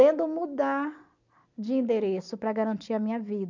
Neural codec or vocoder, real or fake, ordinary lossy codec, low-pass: none; real; none; 7.2 kHz